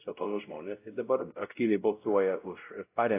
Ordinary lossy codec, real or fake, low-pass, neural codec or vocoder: AAC, 24 kbps; fake; 3.6 kHz; codec, 16 kHz, 0.5 kbps, X-Codec, WavLM features, trained on Multilingual LibriSpeech